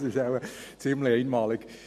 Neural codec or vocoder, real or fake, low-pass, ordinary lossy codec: none; real; 14.4 kHz; MP3, 64 kbps